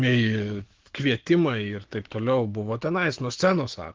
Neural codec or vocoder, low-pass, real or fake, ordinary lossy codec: autoencoder, 48 kHz, 128 numbers a frame, DAC-VAE, trained on Japanese speech; 7.2 kHz; fake; Opus, 16 kbps